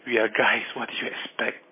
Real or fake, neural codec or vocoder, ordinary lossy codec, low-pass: real; none; MP3, 16 kbps; 3.6 kHz